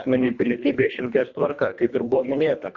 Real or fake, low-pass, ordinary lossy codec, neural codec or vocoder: fake; 7.2 kHz; Opus, 64 kbps; codec, 24 kHz, 1.5 kbps, HILCodec